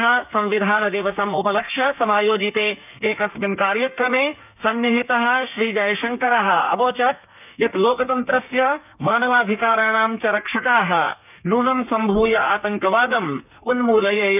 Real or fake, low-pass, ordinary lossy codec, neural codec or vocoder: fake; 3.6 kHz; none; codec, 32 kHz, 1.9 kbps, SNAC